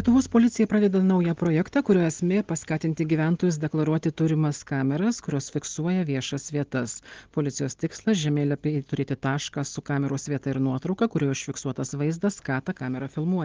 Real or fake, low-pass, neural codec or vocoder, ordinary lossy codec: real; 7.2 kHz; none; Opus, 16 kbps